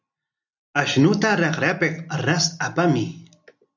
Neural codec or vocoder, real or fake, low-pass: none; real; 7.2 kHz